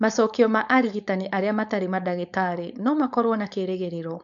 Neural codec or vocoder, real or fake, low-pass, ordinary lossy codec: codec, 16 kHz, 4.8 kbps, FACodec; fake; 7.2 kHz; none